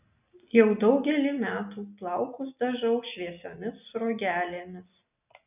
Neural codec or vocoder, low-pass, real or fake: none; 3.6 kHz; real